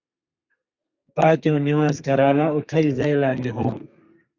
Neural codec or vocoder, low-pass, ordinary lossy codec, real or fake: codec, 32 kHz, 1.9 kbps, SNAC; 7.2 kHz; Opus, 64 kbps; fake